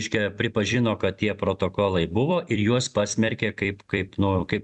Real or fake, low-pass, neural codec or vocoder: fake; 10.8 kHz; vocoder, 44.1 kHz, 128 mel bands every 256 samples, BigVGAN v2